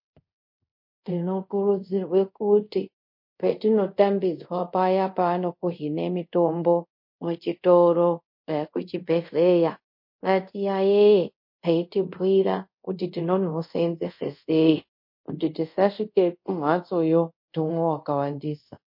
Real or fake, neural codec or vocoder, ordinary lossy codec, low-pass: fake; codec, 24 kHz, 0.5 kbps, DualCodec; MP3, 32 kbps; 5.4 kHz